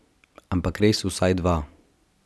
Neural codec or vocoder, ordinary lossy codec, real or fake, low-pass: none; none; real; none